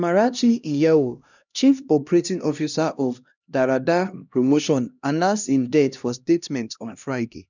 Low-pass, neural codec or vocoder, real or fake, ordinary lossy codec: 7.2 kHz; codec, 16 kHz, 1 kbps, X-Codec, HuBERT features, trained on LibriSpeech; fake; none